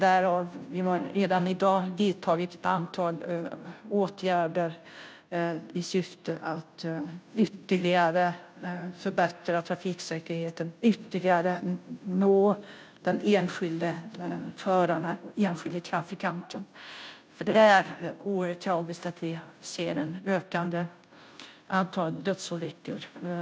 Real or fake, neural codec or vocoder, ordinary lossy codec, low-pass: fake; codec, 16 kHz, 0.5 kbps, FunCodec, trained on Chinese and English, 25 frames a second; none; none